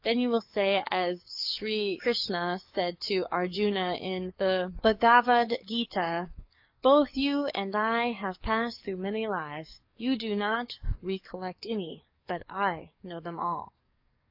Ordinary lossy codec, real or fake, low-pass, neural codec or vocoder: AAC, 48 kbps; fake; 5.4 kHz; codec, 44.1 kHz, 7.8 kbps, DAC